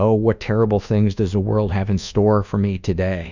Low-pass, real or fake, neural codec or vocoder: 7.2 kHz; fake; codec, 24 kHz, 1.2 kbps, DualCodec